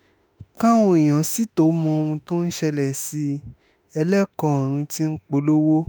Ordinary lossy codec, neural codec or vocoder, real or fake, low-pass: none; autoencoder, 48 kHz, 32 numbers a frame, DAC-VAE, trained on Japanese speech; fake; none